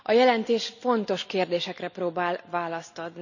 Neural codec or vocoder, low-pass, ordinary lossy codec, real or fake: none; 7.2 kHz; none; real